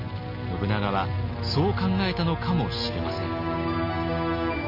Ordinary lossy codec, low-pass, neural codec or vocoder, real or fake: none; 5.4 kHz; none; real